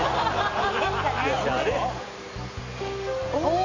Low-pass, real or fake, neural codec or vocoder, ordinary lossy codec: 7.2 kHz; real; none; MP3, 48 kbps